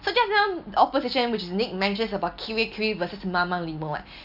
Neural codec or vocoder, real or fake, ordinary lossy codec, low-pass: none; real; none; 5.4 kHz